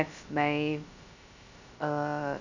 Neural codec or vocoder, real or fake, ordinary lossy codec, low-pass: codec, 16 kHz, 0.2 kbps, FocalCodec; fake; none; 7.2 kHz